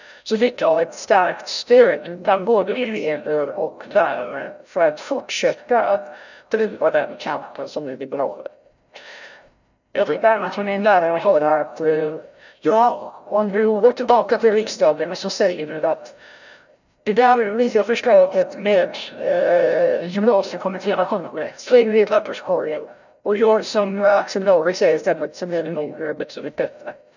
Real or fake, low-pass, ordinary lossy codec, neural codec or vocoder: fake; 7.2 kHz; none; codec, 16 kHz, 0.5 kbps, FreqCodec, larger model